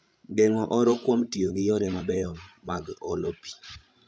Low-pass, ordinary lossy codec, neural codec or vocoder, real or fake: none; none; codec, 16 kHz, 16 kbps, FreqCodec, larger model; fake